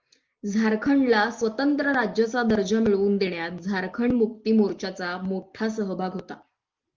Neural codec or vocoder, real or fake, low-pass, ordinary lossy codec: none; real; 7.2 kHz; Opus, 32 kbps